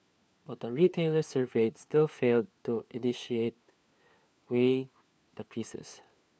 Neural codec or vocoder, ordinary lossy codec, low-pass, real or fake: codec, 16 kHz, 4 kbps, FunCodec, trained on LibriTTS, 50 frames a second; none; none; fake